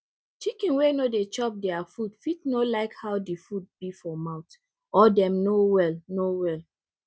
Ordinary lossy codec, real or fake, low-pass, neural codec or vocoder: none; real; none; none